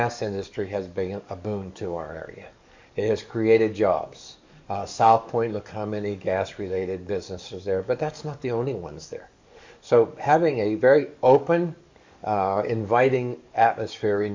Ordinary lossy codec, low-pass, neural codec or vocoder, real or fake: MP3, 64 kbps; 7.2 kHz; codec, 44.1 kHz, 7.8 kbps, DAC; fake